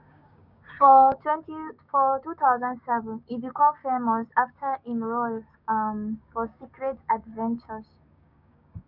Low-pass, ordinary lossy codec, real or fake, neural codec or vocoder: 5.4 kHz; none; fake; codec, 16 kHz, 6 kbps, DAC